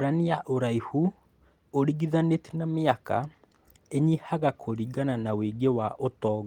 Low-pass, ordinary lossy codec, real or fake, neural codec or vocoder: 19.8 kHz; Opus, 24 kbps; real; none